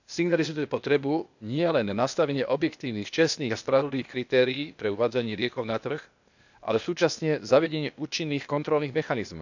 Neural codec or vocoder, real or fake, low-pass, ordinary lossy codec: codec, 16 kHz, 0.8 kbps, ZipCodec; fake; 7.2 kHz; none